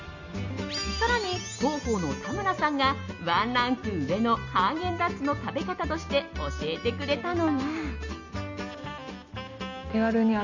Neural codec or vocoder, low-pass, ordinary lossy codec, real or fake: none; 7.2 kHz; none; real